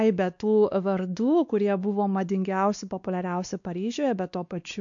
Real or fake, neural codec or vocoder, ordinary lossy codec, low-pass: fake; codec, 16 kHz, 2 kbps, X-Codec, WavLM features, trained on Multilingual LibriSpeech; AAC, 64 kbps; 7.2 kHz